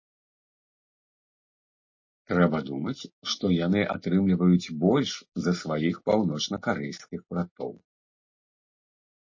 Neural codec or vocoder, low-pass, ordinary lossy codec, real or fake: none; 7.2 kHz; MP3, 32 kbps; real